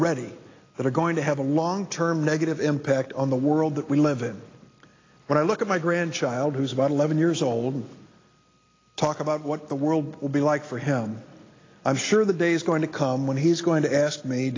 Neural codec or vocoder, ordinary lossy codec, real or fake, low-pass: none; AAC, 32 kbps; real; 7.2 kHz